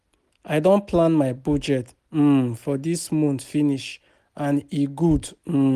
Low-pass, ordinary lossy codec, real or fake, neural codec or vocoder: 14.4 kHz; Opus, 32 kbps; real; none